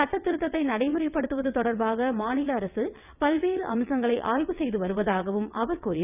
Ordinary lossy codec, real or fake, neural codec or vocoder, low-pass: none; fake; vocoder, 22.05 kHz, 80 mel bands, WaveNeXt; 3.6 kHz